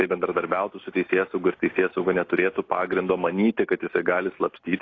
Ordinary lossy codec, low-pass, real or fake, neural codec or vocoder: AAC, 32 kbps; 7.2 kHz; real; none